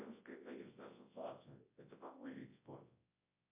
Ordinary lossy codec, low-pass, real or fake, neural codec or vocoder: MP3, 24 kbps; 3.6 kHz; fake; codec, 24 kHz, 0.9 kbps, WavTokenizer, large speech release